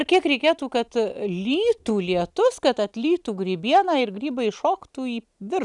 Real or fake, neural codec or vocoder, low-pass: real; none; 10.8 kHz